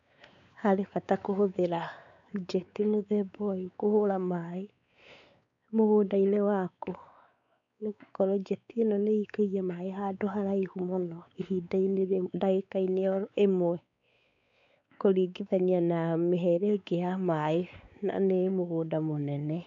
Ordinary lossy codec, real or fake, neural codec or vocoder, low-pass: none; fake; codec, 16 kHz, 4 kbps, X-Codec, WavLM features, trained on Multilingual LibriSpeech; 7.2 kHz